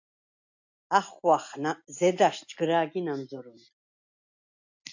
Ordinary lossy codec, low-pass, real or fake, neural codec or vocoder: AAC, 48 kbps; 7.2 kHz; real; none